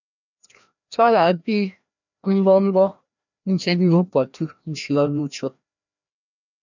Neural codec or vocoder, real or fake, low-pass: codec, 16 kHz, 1 kbps, FreqCodec, larger model; fake; 7.2 kHz